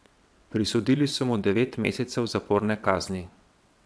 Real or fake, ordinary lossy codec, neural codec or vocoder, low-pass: fake; none; vocoder, 22.05 kHz, 80 mel bands, WaveNeXt; none